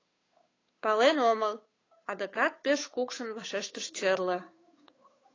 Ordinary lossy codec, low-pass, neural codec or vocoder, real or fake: AAC, 32 kbps; 7.2 kHz; codec, 16 kHz, 8 kbps, FunCodec, trained on Chinese and English, 25 frames a second; fake